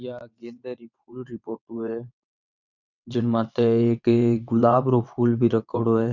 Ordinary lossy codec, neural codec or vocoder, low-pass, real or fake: none; vocoder, 22.05 kHz, 80 mel bands, WaveNeXt; 7.2 kHz; fake